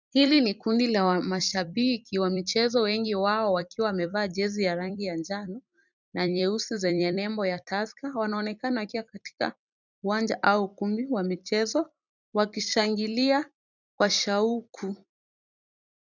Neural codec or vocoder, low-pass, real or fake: vocoder, 44.1 kHz, 128 mel bands every 256 samples, BigVGAN v2; 7.2 kHz; fake